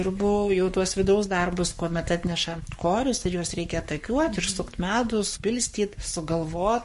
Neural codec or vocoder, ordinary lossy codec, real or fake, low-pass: codec, 44.1 kHz, 7.8 kbps, Pupu-Codec; MP3, 48 kbps; fake; 14.4 kHz